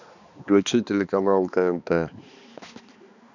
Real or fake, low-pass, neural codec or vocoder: fake; 7.2 kHz; codec, 16 kHz, 2 kbps, X-Codec, HuBERT features, trained on balanced general audio